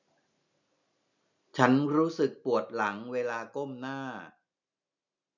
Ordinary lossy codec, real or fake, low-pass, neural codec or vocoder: none; real; 7.2 kHz; none